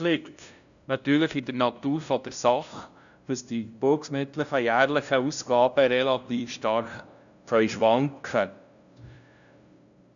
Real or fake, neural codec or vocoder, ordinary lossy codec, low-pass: fake; codec, 16 kHz, 0.5 kbps, FunCodec, trained on LibriTTS, 25 frames a second; none; 7.2 kHz